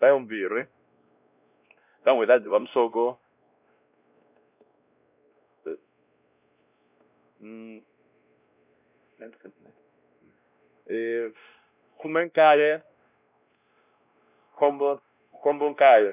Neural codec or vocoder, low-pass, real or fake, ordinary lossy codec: codec, 16 kHz, 1 kbps, X-Codec, WavLM features, trained on Multilingual LibriSpeech; 3.6 kHz; fake; none